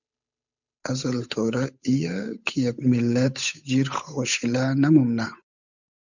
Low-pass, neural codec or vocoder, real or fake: 7.2 kHz; codec, 16 kHz, 8 kbps, FunCodec, trained on Chinese and English, 25 frames a second; fake